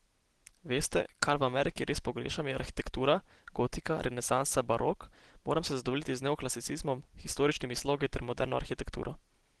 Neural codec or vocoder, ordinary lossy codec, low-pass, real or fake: none; Opus, 16 kbps; 10.8 kHz; real